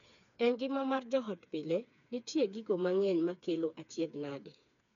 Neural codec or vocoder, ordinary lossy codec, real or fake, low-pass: codec, 16 kHz, 4 kbps, FreqCodec, smaller model; none; fake; 7.2 kHz